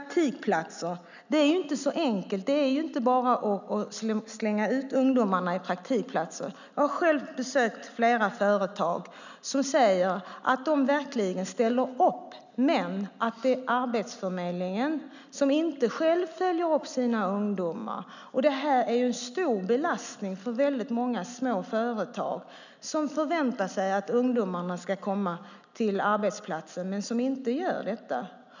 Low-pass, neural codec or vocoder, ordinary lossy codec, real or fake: 7.2 kHz; none; none; real